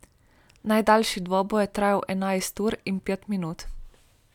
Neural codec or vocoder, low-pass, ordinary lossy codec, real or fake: none; 19.8 kHz; none; real